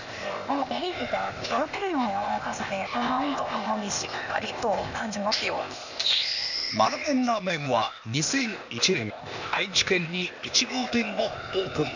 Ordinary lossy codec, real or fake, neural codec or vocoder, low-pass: none; fake; codec, 16 kHz, 0.8 kbps, ZipCodec; 7.2 kHz